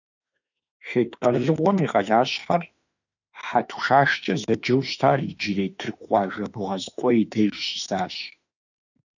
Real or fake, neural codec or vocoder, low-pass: fake; autoencoder, 48 kHz, 32 numbers a frame, DAC-VAE, trained on Japanese speech; 7.2 kHz